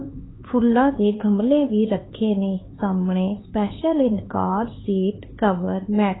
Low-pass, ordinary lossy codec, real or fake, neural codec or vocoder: 7.2 kHz; AAC, 16 kbps; fake; codec, 16 kHz, 4 kbps, X-Codec, HuBERT features, trained on LibriSpeech